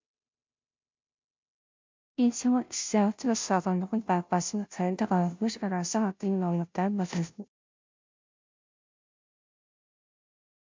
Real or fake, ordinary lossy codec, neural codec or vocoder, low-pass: fake; MP3, 64 kbps; codec, 16 kHz, 0.5 kbps, FunCodec, trained on Chinese and English, 25 frames a second; 7.2 kHz